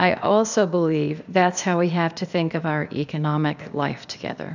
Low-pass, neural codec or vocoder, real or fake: 7.2 kHz; codec, 16 kHz, 0.8 kbps, ZipCodec; fake